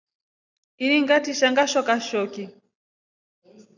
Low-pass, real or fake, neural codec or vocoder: 7.2 kHz; real; none